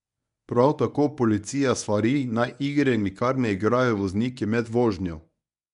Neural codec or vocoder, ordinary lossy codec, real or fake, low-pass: codec, 24 kHz, 0.9 kbps, WavTokenizer, medium speech release version 1; none; fake; 10.8 kHz